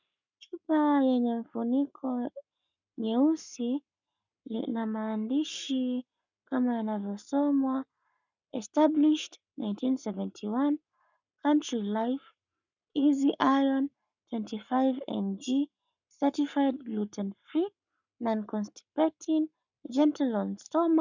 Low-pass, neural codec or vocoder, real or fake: 7.2 kHz; codec, 44.1 kHz, 7.8 kbps, Pupu-Codec; fake